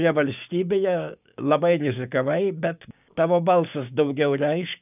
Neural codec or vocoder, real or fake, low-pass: codec, 16 kHz, 6 kbps, DAC; fake; 3.6 kHz